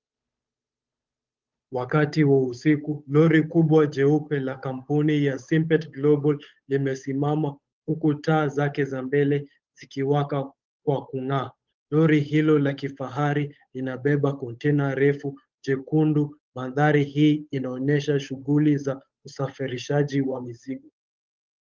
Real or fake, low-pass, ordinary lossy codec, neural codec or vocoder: fake; 7.2 kHz; Opus, 24 kbps; codec, 16 kHz, 8 kbps, FunCodec, trained on Chinese and English, 25 frames a second